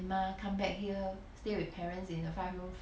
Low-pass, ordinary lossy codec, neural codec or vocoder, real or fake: none; none; none; real